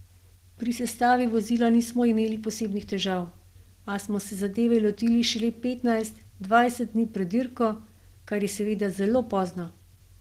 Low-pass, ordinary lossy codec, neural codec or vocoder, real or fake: 14.4 kHz; Opus, 16 kbps; none; real